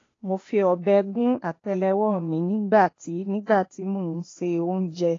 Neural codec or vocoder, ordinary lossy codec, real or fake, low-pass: codec, 16 kHz, 0.8 kbps, ZipCodec; AAC, 32 kbps; fake; 7.2 kHz